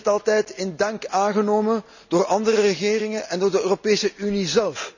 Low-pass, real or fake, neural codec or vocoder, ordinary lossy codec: 7.2 kHz; real; none; none